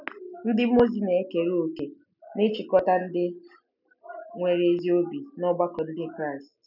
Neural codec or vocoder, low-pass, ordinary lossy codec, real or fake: none; 5.4 kHz; none; real